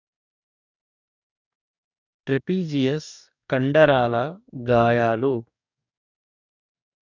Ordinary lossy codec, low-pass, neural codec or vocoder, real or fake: none; 7.2 kHz; codec, 44.1 kHz, 2.6 kbps, DAC; fake